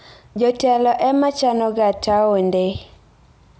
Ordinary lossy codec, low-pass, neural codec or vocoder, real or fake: none; none; none; real